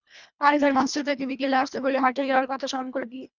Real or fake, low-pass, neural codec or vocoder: fake; 7.2 kHz; codec, 24 kHz, 1.5 kbps, HILCodec